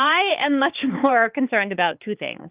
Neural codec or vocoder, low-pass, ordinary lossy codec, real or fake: codec, 16 kHz, 4 kbps, X-Codec, HuBERT features, trained on balanced general audio; 3.6 kHz; Opus, 32 kbps; fake